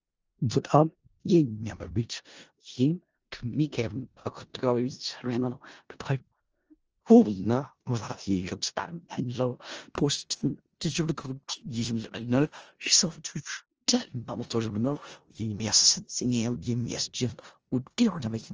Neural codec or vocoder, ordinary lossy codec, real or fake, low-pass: codec, 16 kHz in and 24 kHz out, 0.4 kbps, LongCat-Audio-Codec, four codebook decoder; Opus, 24 kbps; fake; 7.2 kHz